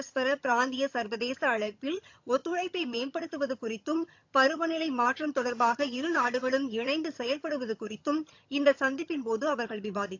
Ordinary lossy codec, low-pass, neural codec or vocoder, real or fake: AAC, 48 kbps; 7.2 kHz; vocoder, 22.05 kHz, 80 mel bands, HiFi-GAN; fake